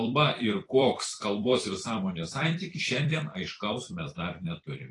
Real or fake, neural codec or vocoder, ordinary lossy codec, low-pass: fake; vocoder, 44.1 kHz, 128 mel bands every 256 samples, BigVGAN v2; AAC, 32 kbps; 10.8 kHz